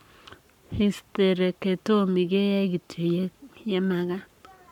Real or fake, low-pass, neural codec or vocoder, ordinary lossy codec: fake; 19.8 kHz; codec, 44.1 kHz, 7.8 kbps, Pupu-Codec; none